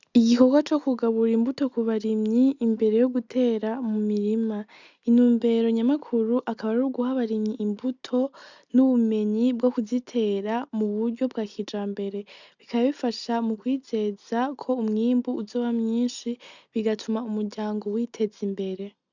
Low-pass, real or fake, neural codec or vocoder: 7.2 kHz; real; none